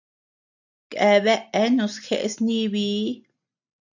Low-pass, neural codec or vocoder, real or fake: 7.2 kHz; none; real